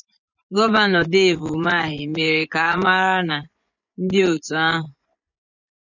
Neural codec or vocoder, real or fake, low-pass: vocoder, 24 kHz, 100 mel bands, Vocos; fake; 7.2 kHz